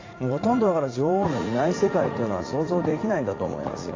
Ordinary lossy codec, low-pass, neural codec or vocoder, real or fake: AAC, 32 kbps; 7.2 kHz; vocoder, 44.1 kHz, 80 mel bands, Vocos; fake